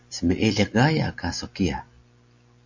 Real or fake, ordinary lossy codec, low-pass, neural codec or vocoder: real; AAC, 48 kbps; 7.2 kHz; none